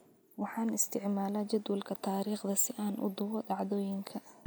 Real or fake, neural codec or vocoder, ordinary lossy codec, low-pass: real; none; none; none